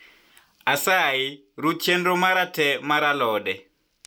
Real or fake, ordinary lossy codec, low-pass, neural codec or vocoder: real; none; none; none